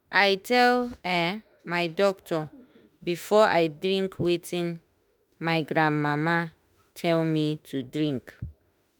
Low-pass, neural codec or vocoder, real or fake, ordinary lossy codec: none; autoencoder, 48 kHz, 32 numbers a frame, DAC-VAE, trained on Japanese speech; fake; none